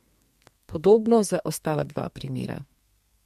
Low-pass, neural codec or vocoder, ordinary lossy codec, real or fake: 14.4 kHz; codec, 32 kHz, 1.9 kbps, SNAC; MP3, 64 kbps; fake